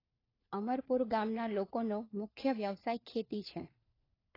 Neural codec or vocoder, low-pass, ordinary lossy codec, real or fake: codec, 16 kHz, 4 kbps, FunCodec, trained on LibriTTS, 50 frames a second; 5.4 kHz; AAC, 24 kbps; fake